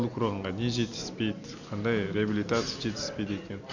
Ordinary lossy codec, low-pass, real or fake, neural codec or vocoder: none; 7.2 kHz; real; none